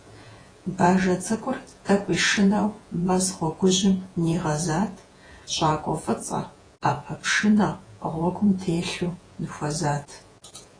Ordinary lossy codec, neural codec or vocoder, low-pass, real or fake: AAC, 32 kbps; vocoder, 48 kHz, 128 mel bands, Vocos; 9.9 kHz; fake